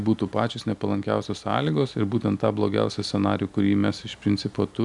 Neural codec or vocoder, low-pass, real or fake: none; 10.8 kHz; real